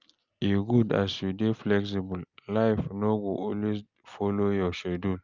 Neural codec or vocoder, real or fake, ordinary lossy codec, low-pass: none; real; Opus, 24 kbps; 7.2 kHz